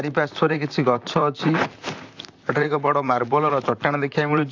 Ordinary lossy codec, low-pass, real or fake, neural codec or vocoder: AAC, 48 kbps; 7.2 kHz; fake; vocoder, 44.1 kHz, 128 mel bands, Pupu-Vocoder